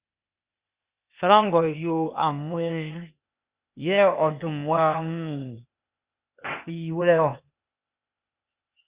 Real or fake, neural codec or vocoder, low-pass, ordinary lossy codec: fake; codec, 16 kHz, 0.8 kbps, ZipCodec; 3.6 kHz; Opus, 64 kbps